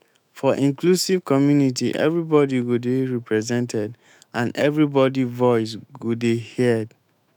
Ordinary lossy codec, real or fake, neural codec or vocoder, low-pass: none; fake; autoencoder, 48 kHz, 128 numbers a frame, DAC-VAE, trained on Japanese speech; 19.8 kHz